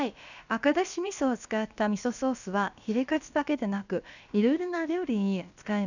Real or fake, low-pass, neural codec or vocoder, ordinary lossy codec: fake; 7.2 kHz; codec, 16 kHz, about 1 kbps, DyCAST, with the encoder's durations; none